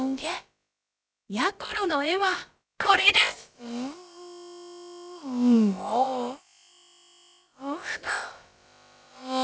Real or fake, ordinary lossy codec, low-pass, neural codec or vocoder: fake; none; none; codec, 16 kHz, about 1 kbps, DyCAST, with the encoder's durations